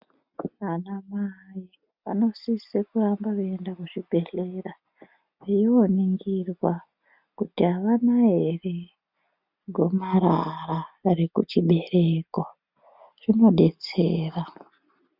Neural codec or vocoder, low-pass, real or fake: none; 5.4 kHz; real